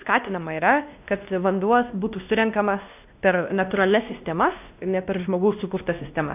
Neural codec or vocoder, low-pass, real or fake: codec, 16 kHz, 1 kbps, X-Codec, WavLM features, trained on Multilingual LibriSpeech; 3.6 kHz; fake